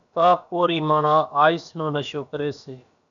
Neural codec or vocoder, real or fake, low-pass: codec, 16 kHz, about 1 kbps, DyCAST, with the encoder's durations; fake; 7.2 kHz